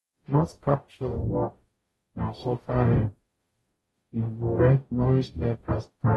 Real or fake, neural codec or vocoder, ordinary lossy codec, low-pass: fake; codec, 44.1 kHz, 0.9 kbps, DAC; AAC, 32 kbps; 19.8 kHz